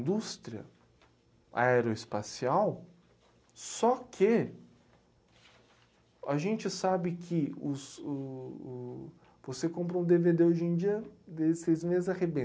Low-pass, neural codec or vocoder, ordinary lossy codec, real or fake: none; none; none; real